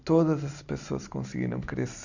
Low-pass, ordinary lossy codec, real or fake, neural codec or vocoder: 7.2 kHz; none; real; none